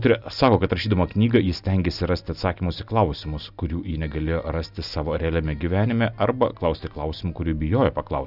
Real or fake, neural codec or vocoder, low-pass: real; none; 5.4 kHz